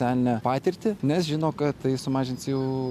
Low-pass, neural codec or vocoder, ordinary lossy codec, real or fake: 14.4 kHz; none; AAC, 96 kbps; real